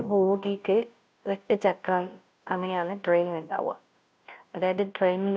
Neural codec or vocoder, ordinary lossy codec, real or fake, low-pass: codec, 16 kHz, 0.5 kbps, FunCodec, trained on Chinese and English, 25 frames a second; none; fake; none